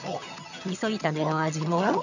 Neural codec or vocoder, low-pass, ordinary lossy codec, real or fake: vocoder, 22.05 kHz, 80 mel bands, HiFi-GAN; 7.2 kHz; none; fake